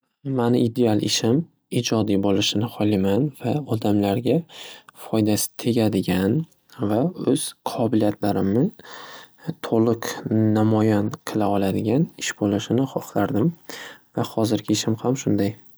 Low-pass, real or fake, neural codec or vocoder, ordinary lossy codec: none; real; none; none